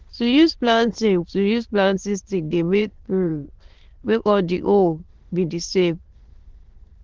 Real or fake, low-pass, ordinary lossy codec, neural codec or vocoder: fake; 7.2 kHz; Opus, 16 kbps; autoencoder, 22.05 kHz, a latent of 192 numbers a frame, VITS, trained on many speakers